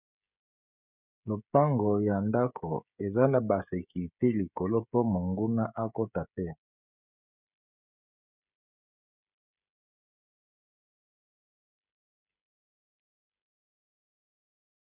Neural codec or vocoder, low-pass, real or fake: codec, 16 kHz, 16 kbps, FreqCodec, smaller model; 3.6 kHz; fake